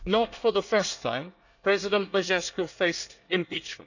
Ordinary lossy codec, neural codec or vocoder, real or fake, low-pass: none; codec, 24 kHz, 1 kbps, SNAC; fake; 7.2 kHz